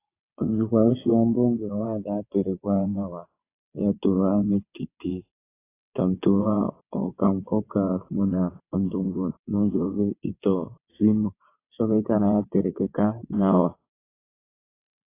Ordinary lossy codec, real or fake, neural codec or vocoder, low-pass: AAC, 24 kbps; fake; vocoder, 22.05 kHz, 80 mel bands, WaveNeXt; 3.6 kHz